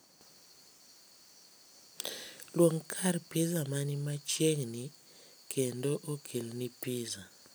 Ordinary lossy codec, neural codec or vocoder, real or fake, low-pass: none; none; real; none